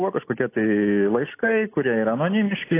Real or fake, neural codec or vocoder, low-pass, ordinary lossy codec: real; none; 3.6 kHz; AAC, 24 kbps